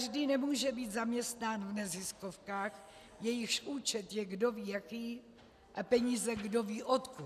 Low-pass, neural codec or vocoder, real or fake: 14.4 kHz; none; real